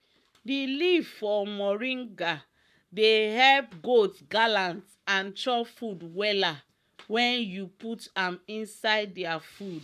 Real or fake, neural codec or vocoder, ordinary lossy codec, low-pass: fake; codec, 44.1 kHz, 7.8 kbps, Pupu-Codec; none; 14.4 kHz